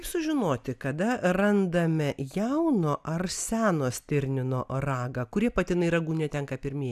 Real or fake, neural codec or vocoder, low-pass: real; none; 14.4 kHz